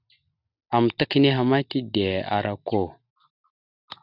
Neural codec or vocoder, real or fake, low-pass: none; real; 5.4 kHz